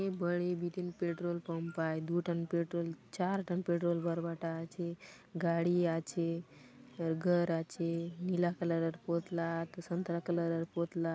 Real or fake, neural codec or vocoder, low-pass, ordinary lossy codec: real; none; none; none